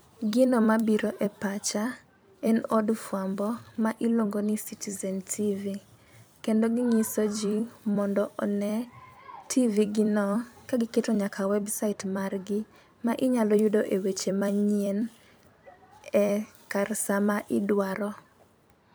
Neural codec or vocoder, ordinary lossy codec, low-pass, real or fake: vocoder, 44.1 kHz, 128 mel bands every 256 samples, BigVGAN v2; none; none; fake